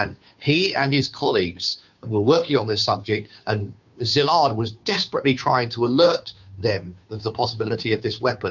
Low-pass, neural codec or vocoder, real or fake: 7.2 kHz; codec, 16 kHz, 2 kbps, FunCodec, trained on Chinese and English, 25 frames a second; fake